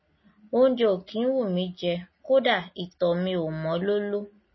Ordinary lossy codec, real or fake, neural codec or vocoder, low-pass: MP3, 24 kbps; real; none; 7.2 kHz